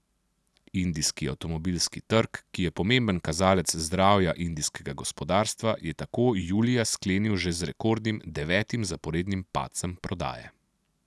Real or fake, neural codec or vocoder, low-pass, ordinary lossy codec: real; none; none; none